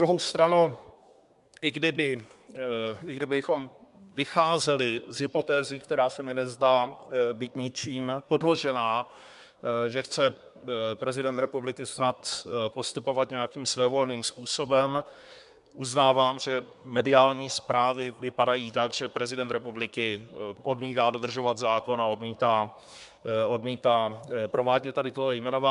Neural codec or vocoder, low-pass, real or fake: codec, 24 kHz, 1 kbps, SNAC; 10.8 kHz; fake